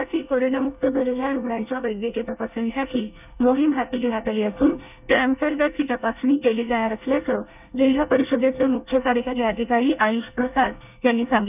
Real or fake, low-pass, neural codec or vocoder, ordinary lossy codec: fake; 3.6 kHz; codec, 24 kHz, 1 kbps, SNAC; none